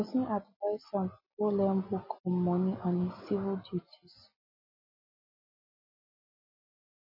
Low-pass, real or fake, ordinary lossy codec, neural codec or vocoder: 5.4 kHz; real; MP3, 24 kbps; none